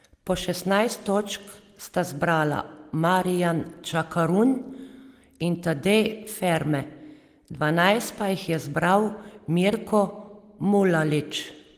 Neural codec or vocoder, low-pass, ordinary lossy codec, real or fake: none; 14.4 kHz; Opus, 24 kbps; real